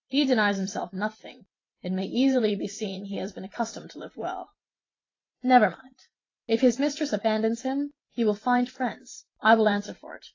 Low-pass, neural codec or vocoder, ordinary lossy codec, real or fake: 7.2 kHz; vocoder, 44.1 kHz, 128 mel bands every 512 samples, BigVGAN v2; AAC, 32 kbps; fake